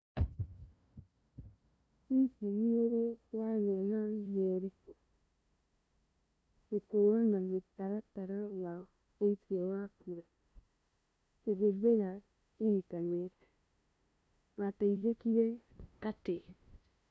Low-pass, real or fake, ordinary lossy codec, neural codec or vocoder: none; fake; none; codec, 16 kHz, 0.5 kbps, FunCodec, trained on LibriTTS, 25 frames a second